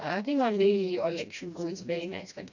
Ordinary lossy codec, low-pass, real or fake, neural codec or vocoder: none; 7.2 kHz; fake; codec, 16 kHz, 1 kbps, FreqCodec, smaller model